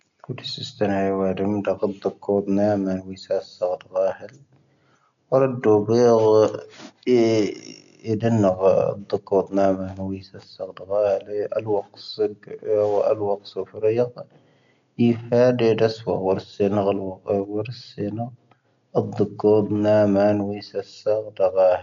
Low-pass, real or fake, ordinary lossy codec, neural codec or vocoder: 7.2 kHz; real; none; none